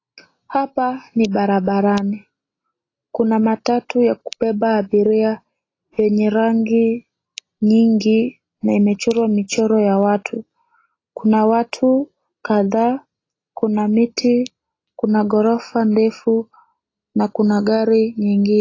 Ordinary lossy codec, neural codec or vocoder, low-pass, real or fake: AAC, 32 kbps; none; 7.2 kHz; real